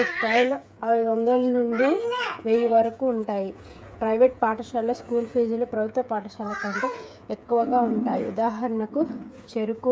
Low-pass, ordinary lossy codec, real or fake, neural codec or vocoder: none; none; fake; codec, 16 kHz, 8 kbps, FreqCodec, smaller model